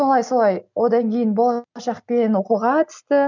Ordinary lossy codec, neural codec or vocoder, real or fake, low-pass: none; none; real; 7.2 kHz